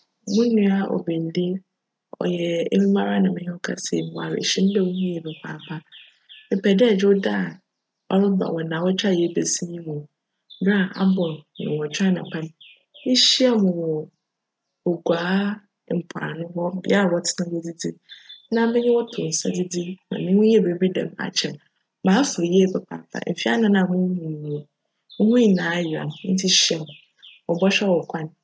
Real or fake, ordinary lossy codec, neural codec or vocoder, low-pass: real; none; none; none